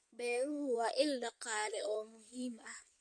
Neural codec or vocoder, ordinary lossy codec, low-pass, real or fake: codec, 16 kHz in and 24 kHz out, 2.2 kbps, FireRedTTS-2 codec; MP3, 48 kbps; 9.9 kHz; fake